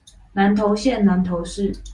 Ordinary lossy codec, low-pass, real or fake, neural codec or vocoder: Opus, 32 kbps; 10.8 kHz; real; none